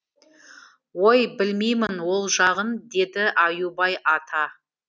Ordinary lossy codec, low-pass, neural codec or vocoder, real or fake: none; none; none; real